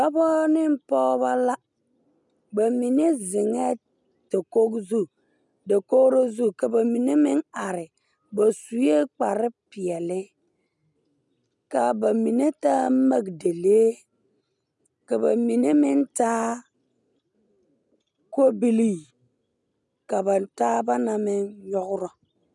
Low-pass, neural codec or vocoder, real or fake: 10.8 kHz; none; real